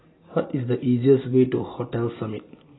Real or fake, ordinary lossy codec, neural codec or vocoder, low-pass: real; AAC, 16 kbps; none; 7.2 kHz